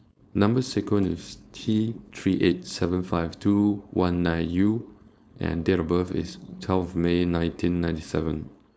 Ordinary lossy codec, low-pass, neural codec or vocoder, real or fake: none; none; codec, 16 kHz, 4.8 kbps, FACodec; fake